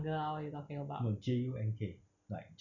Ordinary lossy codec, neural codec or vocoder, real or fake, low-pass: none; none; real; 7.2 kHz